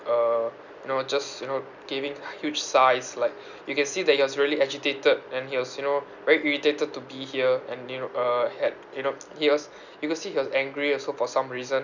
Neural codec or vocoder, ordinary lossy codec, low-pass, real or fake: none; none; 7.2 kHz; real